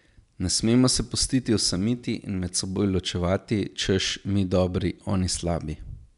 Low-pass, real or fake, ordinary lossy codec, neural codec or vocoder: 10.8 kHz; real; none; none